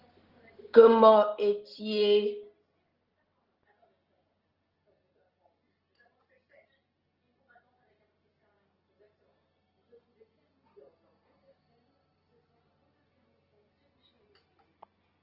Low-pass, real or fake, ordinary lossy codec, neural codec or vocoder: 5.4 kHz; real; Opus, 16 kbps; none